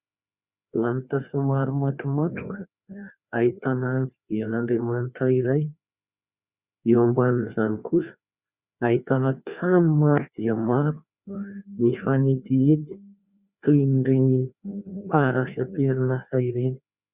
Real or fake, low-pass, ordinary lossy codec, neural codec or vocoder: fake; 3.6 kHz; Opus, 64 kbps; codec, 16 kHz, 2 kbps, FreqCodec, larger model